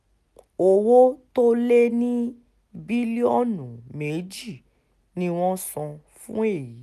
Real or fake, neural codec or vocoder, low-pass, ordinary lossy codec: real; none; 14.4 kHz; none